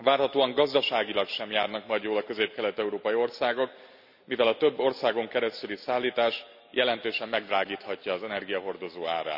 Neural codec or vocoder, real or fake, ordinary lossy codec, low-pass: none; real; none; 5.4 kHz